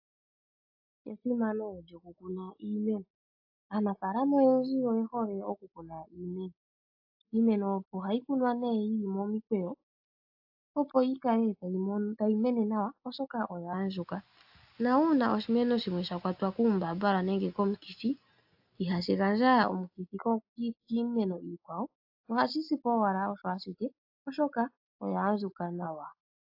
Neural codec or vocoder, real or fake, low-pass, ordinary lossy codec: none; real; 5.4 kHz; AAC, 48 kbps